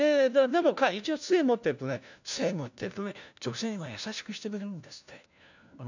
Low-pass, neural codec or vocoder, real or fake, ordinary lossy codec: 7.2 kHz; codec, 16 kHz, 1 kbps, FunCodec, trained on LibriTTS, 50 frames a second; fake; none